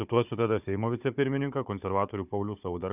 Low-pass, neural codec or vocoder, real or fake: 3.6 kHz; codec, 16 kHz, 4 kbps, FunCodec, trained on Chinese and English, 50 frames a second; fake